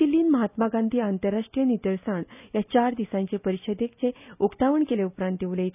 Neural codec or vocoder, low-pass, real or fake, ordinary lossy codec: none; 3.6 kHz; real; none